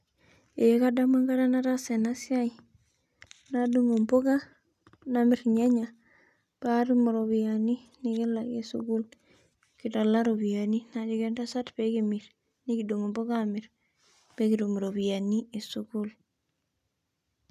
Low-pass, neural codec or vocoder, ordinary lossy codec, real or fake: 14.4 kHz; none; none; real